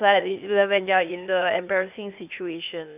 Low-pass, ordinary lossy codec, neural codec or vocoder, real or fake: 3.6 kHz; none; codec, 16 kHz, 0.8 kbps, ZipCodec; fake